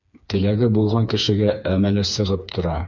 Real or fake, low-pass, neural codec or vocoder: fake; 7.2 kHz; codec, 16 kHz, 4 kbps, FreqCodec, smaller model